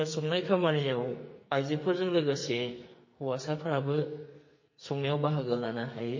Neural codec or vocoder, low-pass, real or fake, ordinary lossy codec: codec, 44.1 kHz, 2.6 kbps, SNAC; 7.2 kHz; fake; MP3, 32 kbps